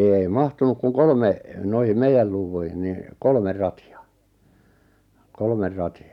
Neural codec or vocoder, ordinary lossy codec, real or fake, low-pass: none; none; real; 19.8 kHz